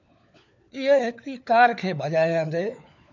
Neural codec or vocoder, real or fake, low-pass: codec, 16 kHz, 4 kbps, FunCodec, trained on LibriTTS, 50 frames a second; fake; 7.2 kHz